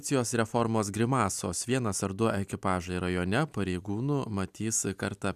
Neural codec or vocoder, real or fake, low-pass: none; real; 14.4 kHz